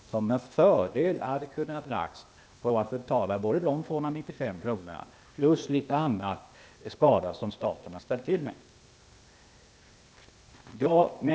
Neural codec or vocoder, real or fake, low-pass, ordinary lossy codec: codec, 16 kHz, 0.8 kbps, ZipCodec; fake; none; none